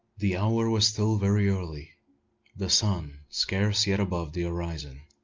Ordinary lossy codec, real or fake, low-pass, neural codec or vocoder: Opus, 32 kbps; real; 7.2 kHz; none